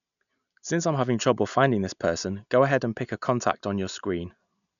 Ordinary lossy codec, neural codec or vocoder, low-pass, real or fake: none; none; 7.2 kHz; real